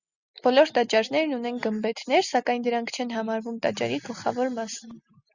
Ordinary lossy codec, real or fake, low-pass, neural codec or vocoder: Opus, 64 kbps; real; 7.2 kHz; none